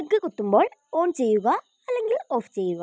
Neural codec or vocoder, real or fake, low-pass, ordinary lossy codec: none; real; none; none